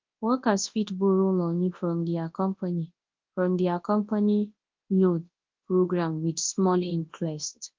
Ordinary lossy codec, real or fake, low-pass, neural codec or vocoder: Opus, 32 kbps; fake; 7.2 kHz; codec, 24 kHz, 0.9 kbps, WavTokenizer, large speech release